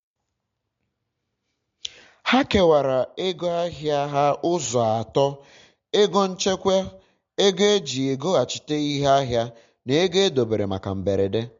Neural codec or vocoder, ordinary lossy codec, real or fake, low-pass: none; MP3, 48 kbps; real; 7.2 kHz